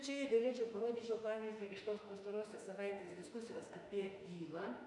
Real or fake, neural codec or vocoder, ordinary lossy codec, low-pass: fake; autoencoder, 48 kHz, 32 numbers a frame, DAC-VAE, trained on Japanese speech; AAC, 64 kbps; 10.8 kHz